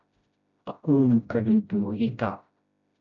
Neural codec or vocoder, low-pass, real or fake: codec, 16 kHz, 0.5 kbps, FreqCodec, smaller model; 7.2 kHz; fake